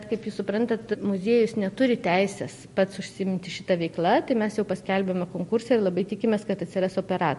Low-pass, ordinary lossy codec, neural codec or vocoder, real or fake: 14.4 kHz; MP3, 48 kbps; none; real